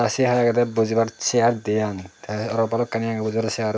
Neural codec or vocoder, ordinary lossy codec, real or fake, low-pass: none; none; real; none